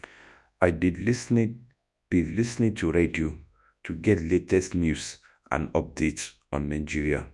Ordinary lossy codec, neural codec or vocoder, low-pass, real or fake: none; codec, 24 kHz, 0.9 kbps, WavTokenizer, large speech release; 10.8 kHz; fake